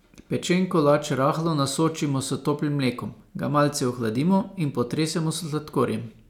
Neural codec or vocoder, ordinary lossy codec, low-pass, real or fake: none; none; 19.8 kHz; real